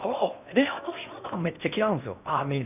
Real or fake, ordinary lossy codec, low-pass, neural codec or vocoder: fake; none; 3.6 kHz; codec, 16 kHz in and 24 kHz out, 0.6 kbps, FocalCodec, streaming, 4096 codes